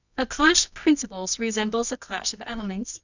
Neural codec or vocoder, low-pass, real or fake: codec, 24 kHz, 0.9 kbps, WavTokenizer, medium music audio release; 7.2 kHz; fake